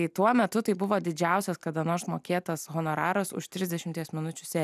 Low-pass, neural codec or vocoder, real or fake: 14.4 kHz; none; real